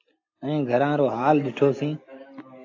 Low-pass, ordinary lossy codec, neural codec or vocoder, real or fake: 7.2 kHz; AAC, 48 kbps; none; real